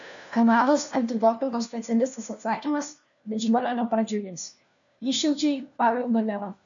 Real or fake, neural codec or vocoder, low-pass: fake; codec, 16 kHz, 1 kbps, FunCodec, trained on LibriTTS, 50 frames a second; 7.2 kHz